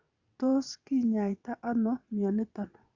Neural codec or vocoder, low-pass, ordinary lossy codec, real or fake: vocoder, 22.05 kHz, 80 mel bands, WaveNeXt; 7.2 kHz; Opus, 64 kbps; fake